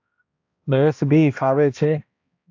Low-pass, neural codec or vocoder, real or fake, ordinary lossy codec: 7.2 kHz; codec, 16 kHz, 1 kbps, X-Codec, HuBERT features, trained on balanced general audio; fake; AAC, 48 kbps